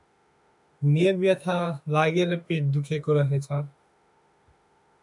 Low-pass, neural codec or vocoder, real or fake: 10.8 kHz; autoencoder, 48 kHz, 32 numbers a frame, DAC-VAE, trained on Japanese speech; fake